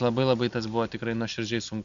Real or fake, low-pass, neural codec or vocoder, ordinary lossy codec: real; 7.2 kHz; none; AAC, 96 kbps